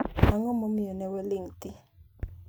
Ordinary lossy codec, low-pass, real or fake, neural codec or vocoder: none; none; real; none